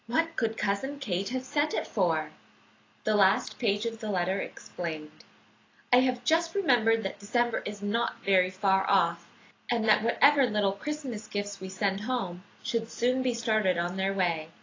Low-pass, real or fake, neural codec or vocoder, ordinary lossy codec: 7.2 kHz; real; none; AAC, 32 kbps